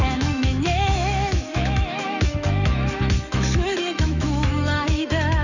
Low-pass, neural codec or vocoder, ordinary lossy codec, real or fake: 7.2 kHz; autoencoder, 48 kHz, 128 numbers a frame, DAC-VAE, trained on Japanese speech; none; fake